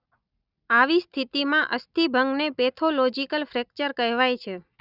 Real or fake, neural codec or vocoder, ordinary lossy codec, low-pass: real; none; none; 5.4 kHz